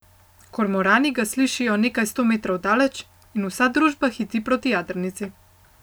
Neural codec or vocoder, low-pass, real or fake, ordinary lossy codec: none; none; real; none